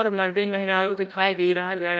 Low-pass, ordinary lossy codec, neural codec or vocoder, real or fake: none; none; codec, 16 kHz, 0.5 kbps, FreqCodec, larger model; fake